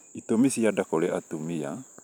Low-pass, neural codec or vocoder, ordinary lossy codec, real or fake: none; vocoder, 44.1 kHz, 128 mel bands every 512 samples, BigVGAN v2; none; fake